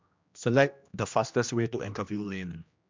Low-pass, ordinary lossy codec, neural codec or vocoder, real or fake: 7.2 kHz; MP3, 64 kbps; codec, 16 kHz, 1 kbps, X-Codec, HuBERT features, trained on general audio; fake